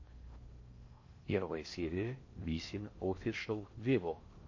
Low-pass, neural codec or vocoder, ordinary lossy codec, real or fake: 7.2 kHz; codec, 16 kHz in and 24 kHz out, 0.6 kbps, FocalCodec, streaming, 4096 codes; MP3, 32 kbps; fake